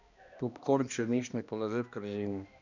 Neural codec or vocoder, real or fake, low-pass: codec, 16 kHz, 1 kbps, X-Codec, HuBERT features, trained on balanced general audio; fake; 7.2 kHz